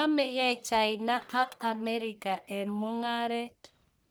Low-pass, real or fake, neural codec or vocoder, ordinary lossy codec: none; fake; codec, 44.1 kHz, 1.7 kbps, Pupu-Codec; none